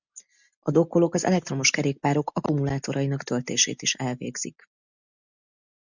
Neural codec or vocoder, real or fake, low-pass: none; real; 7.2 kHz